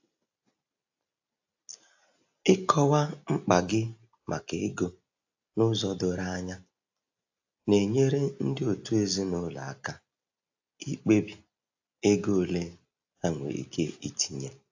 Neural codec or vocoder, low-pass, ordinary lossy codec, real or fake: none; 7.2 kHz; AAC, 48 kbps; real